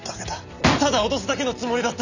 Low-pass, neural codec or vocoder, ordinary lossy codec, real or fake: 7.2 kHz; none; none; real